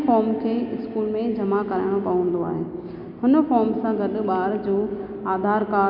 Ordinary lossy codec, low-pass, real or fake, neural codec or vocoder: none; 5.4 kHz; real; none